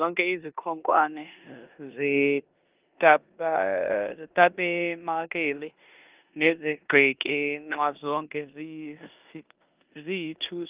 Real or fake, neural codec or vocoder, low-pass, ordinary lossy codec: fake; codec, 16 kHz in and 24 kHz out, 0.9 kbps, LongCat-Audio-Codec, four codebook decoder; 3.6 kHz; Opus, 24 kbps